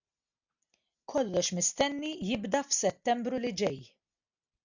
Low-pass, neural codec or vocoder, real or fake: 7.2 kHz; none; real